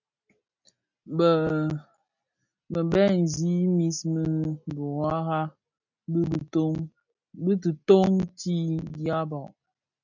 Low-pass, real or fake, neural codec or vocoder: 7.2 kHz; real; none